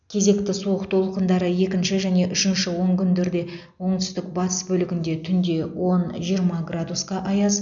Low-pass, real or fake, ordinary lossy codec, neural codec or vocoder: 7.2 kHz; real; none; none